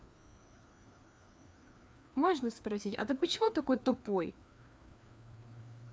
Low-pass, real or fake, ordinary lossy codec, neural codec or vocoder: none; fake; none; codec, 16 kHz, 2 kbps, FreqCodec, larger model